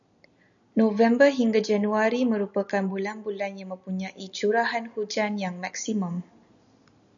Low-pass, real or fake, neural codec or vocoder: 7.2 kHz; real; none